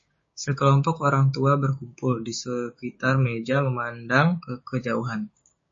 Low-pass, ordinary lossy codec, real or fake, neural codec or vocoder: 7.2 kHz; MP3, 32 kbps; fake; codec, 16 kHz, 6 kbps, DAC